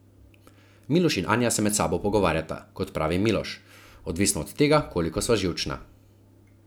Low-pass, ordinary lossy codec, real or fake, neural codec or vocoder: none; none; real; none